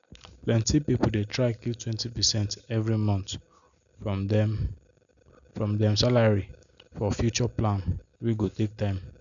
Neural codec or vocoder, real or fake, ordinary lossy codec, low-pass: none; real; none; 7.2 kHz